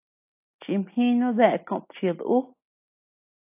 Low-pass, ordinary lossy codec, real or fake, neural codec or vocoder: 3.6 kHz; AAC, 24 kbps; real; none